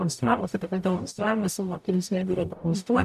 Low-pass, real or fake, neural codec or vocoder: 14.4 kHz; fake; codec, 44.1 kHz, 0.9 kbps, DAC